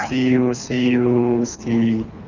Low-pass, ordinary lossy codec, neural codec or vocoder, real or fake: 7.2 kHz; none; codec, 24 kHz, 3 kbps, HILCodec; fake